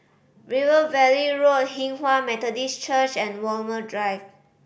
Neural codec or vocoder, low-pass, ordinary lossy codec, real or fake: none; none; none; real